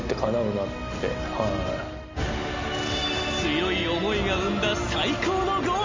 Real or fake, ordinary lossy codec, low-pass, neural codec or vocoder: real; MP3, 48 kbps; 7.2 kHz; none